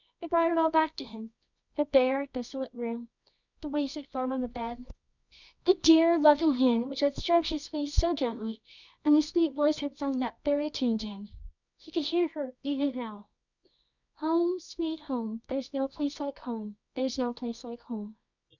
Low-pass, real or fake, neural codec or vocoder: 7.2 kHz; fake; codec, 24 kHz, 0.9 kbps, WavTokenizer, medium music audio release